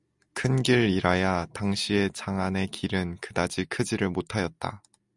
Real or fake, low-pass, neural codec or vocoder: real; 10.8 kHz; none